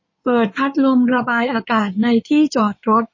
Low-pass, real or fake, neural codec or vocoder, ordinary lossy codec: 7.2 kHz; fake; codec, 16 kHz in and 24 kHz out, 2.2 kbps, FireRedTTS-2 codec; MP3, 32 kbps